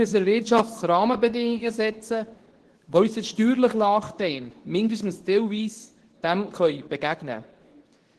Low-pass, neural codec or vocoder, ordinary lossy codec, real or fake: 10.8 kHz; codec, 24 kHz, 0.9 kbps, WavTokenizer, medium speech release version 2; Opus, 16 kbps; fake